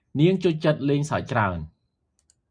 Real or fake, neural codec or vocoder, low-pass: real; none; 9.9 kHz